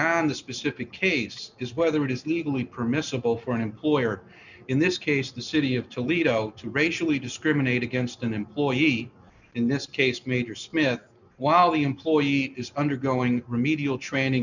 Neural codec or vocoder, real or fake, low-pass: none; real; 7.2 kHz